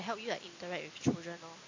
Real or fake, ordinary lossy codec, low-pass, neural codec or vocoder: real; AAC, 48 kbps; 7.2 kHz; none